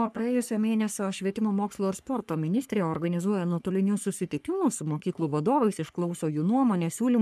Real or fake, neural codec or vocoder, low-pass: fake; codec, 44.1 kHz, 3.4 kbps, Pupu-Codec; 14.4 kHz